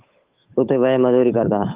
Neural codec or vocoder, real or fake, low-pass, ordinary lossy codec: codec, 16 kHz, 8 kbps, FunCodec, trained on Chinese and English, 25 frames a second; fake; 3.6 kHz; Opus, 32 kbps